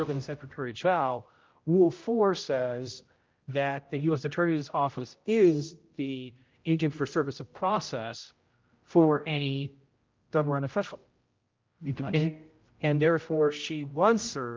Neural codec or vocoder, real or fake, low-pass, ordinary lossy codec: codec, 16 kHz, 0.5 kbps, X-Codec, HuBERT features, trained on general audio; fake; 7.2 kHz; Opus, 32 kbps